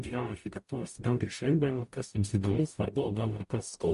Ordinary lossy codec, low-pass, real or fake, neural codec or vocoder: MP3, 48 kbps; 14.4 kHz; fake; codec, 44.1 kHz, 0.9 kbps, DAC